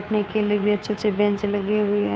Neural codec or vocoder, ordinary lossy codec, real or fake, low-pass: codec, 16 kHz, 2 kbps, FunCodec, trained on Chinese and English, 25 frames a second; none; fake; none